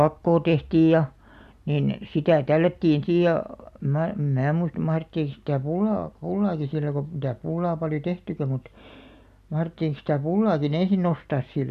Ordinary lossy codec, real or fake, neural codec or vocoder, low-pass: MP3, 96 kbps; real; none; 14.4 kHz